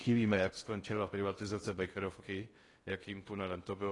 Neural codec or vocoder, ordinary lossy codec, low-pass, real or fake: codec, 16 kHz in and 24 kHz out, 0.6 kbps, FocalCodec, streaming, 2048 codes; AAC, 32 kbps; 10.8 kHz; fake